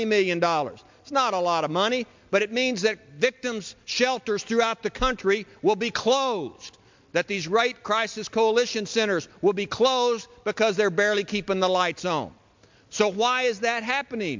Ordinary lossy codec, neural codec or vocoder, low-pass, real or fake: MP3, 64 kbps; none; 7.2 kHz; real